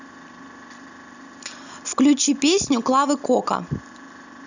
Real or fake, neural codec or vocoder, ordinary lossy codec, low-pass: real; none; none; 7.2 kHz